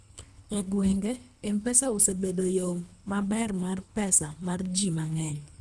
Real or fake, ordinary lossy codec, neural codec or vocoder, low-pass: fake; none; codec, 24 kHz, 3 kbps, HILCodec; none